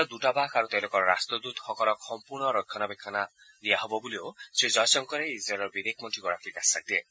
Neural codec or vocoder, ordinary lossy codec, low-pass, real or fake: none; none; none; real